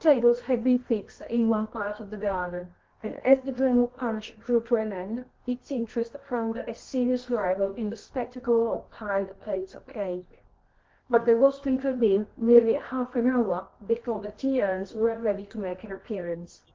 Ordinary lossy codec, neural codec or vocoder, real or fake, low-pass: Opus, 16 kbps; codec, 24 kHz, 0.9 kbps, WavTokenizer, medium music audio release; fake; 7.2 kHz